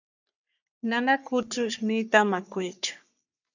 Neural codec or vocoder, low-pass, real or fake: codec, 44.1 kHz, 3.4 kbps, Pupu-Codec; 7.2 kHz; fake